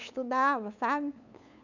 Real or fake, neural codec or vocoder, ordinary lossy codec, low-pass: fake; codec, 16 kHz, 8 kbps, FunCodec, trained on LibriTTS, 25 frames a second; none; 7.2 kHz